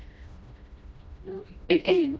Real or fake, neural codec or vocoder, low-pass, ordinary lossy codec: fake; codec, 16 kHz, 1 kbps, FreqCodec, smaller model; none; none